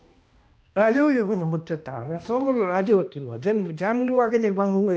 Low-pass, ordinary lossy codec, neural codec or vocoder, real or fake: none; none; codec, 16 kHz, 1 kbps, X-Codec, HuBERT features, trained on balanced general audio; fake